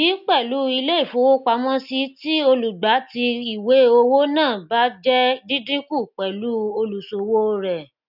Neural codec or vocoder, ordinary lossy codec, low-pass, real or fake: none; none; 5.4 kHz; real